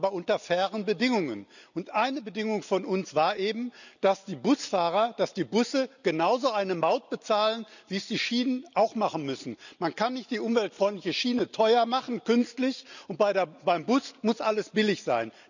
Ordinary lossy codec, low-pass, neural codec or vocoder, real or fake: none; 7.2 kHz; none; real